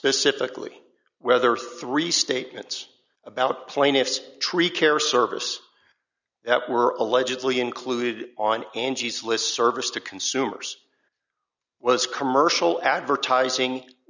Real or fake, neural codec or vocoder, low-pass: real; none; 7.2 kHz